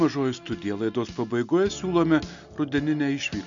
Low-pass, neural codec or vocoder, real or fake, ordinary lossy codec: 7.2 kHz; none; real; MP3, 64 kbps